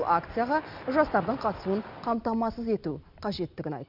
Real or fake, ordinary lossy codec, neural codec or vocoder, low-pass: real; Opus, 64 kbps; none; 5.4 kHz